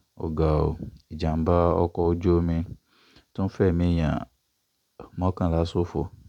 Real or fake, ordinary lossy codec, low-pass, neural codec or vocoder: fake; none; 19.8 kHz; vocoder, 44.1 kHz, 128 mel bands every 256 samples, BigVGAN v2